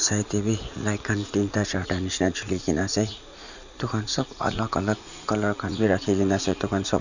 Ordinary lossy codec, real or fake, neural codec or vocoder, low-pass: none; fake; vocoder, 44.1 kHz, 80 mel bands, Vocos; 7.2 kHz